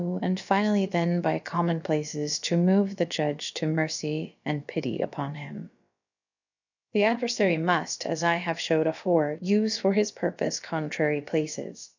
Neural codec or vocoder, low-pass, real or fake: codec, 16 kHz, about 1 kbps, DyCAST, with the encoder's durations; 7.2 kHz; fake